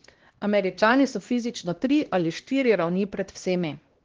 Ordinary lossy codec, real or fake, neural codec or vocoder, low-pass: Opus, 16 kbps; fake; codec, 16 kHz, 1 kbps, X-Codec, HuBERT features, trained on LibriSpeech; 7.2 kHz